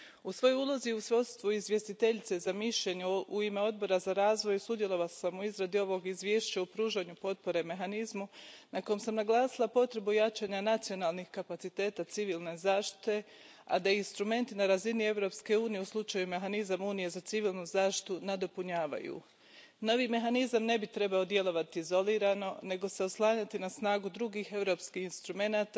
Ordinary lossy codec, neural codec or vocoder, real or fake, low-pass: none; none; real; none